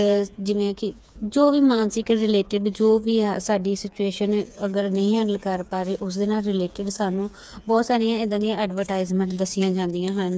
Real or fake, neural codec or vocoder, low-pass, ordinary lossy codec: fake; codec, 16 kHz, 4 kbps, FreqCodec, smaller model; none; none